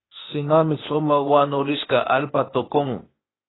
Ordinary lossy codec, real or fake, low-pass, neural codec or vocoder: AAC, 16 kbps; fake; 7.2 kHz; codec, 16 kHz, 0.8 kbps, ZipCodec